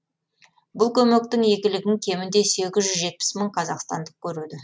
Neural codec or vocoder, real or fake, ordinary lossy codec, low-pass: none; real; none; none